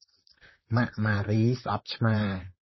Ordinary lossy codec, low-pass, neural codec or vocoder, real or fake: MP3, 24 kbps; 7.2 kHz; vocoder, 44.1 kHz, 128 mel bands, Pupu-Vocoder; fake